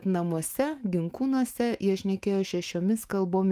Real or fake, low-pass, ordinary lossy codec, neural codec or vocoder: fake; 14.4 kHz; Opus, 32 kbps; autoencoder, 48 kHz, 128 numbers a frame, DAC-VAE, trained on Japanese speech